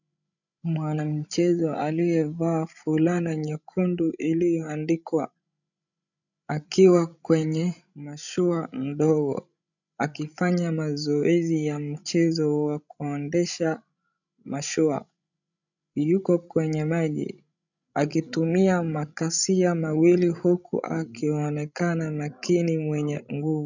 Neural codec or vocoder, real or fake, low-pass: codec, 16 kHz, 16 kbps, FreqCodec, larger model; fake; 7.2 kHz